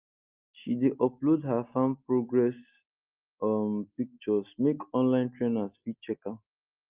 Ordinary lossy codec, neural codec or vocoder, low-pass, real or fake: Opus, 32 kbps; none; 3.6 kHz; real